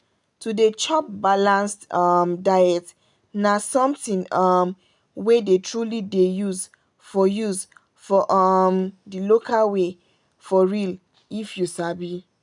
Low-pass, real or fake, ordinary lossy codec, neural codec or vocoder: 10.8 kHz; real; none; none